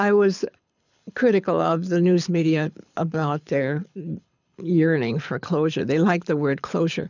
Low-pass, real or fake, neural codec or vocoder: 7.2 kHz; fake; codec, 24 kHz, 6 kbps, HILCodec